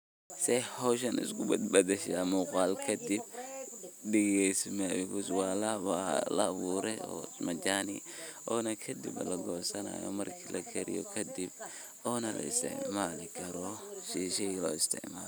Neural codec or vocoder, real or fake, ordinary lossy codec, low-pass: none; real; none; none